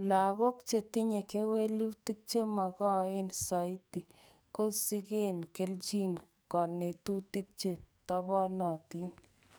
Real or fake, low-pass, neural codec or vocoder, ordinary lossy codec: fake; none; codec, 44.1 kHz, 2.6 kbps, SNAC; none